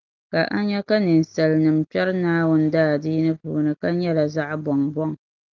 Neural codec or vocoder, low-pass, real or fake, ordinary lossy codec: none; 7.2 kHz; real; Opus, 24 kbps